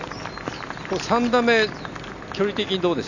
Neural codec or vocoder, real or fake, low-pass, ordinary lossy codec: none; real; 7.2 kHz; none